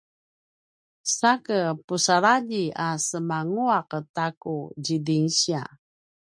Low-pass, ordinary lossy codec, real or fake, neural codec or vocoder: 9.9 kHz; MP3, 64 kbps; real; none